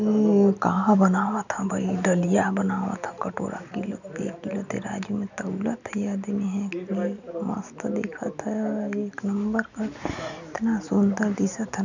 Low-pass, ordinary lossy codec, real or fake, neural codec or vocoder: 7.2 kHz; none; real; none